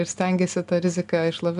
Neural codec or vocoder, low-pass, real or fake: none; 10.8 kHz; real